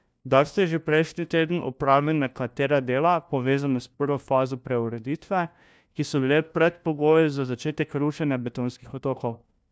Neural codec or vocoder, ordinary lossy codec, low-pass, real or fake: codec, 16 kHz, 1 kbps, FunCodec, trained on LibriTTS, 50 frames a second; none; none; fake